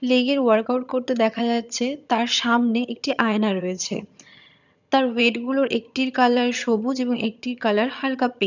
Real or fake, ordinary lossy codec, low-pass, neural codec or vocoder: fake; none; 7.2 kHz; vocoder, 22.05 kHz, 80 mel bands, HiFi-GAN